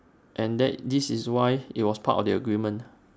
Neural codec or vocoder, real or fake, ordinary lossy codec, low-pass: none; real; none; none